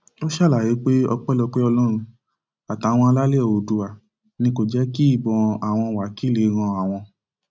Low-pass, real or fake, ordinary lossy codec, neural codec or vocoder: none; real; none; none